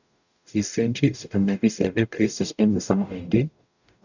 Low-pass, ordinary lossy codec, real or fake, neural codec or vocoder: 7.2 kHz; none; fake; codec, 44.1 kHz, 0.9 kbps, DAC